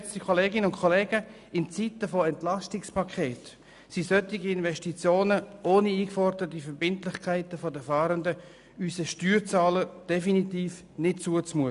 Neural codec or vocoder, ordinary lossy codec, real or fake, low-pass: none; none; real; 10.8 kHz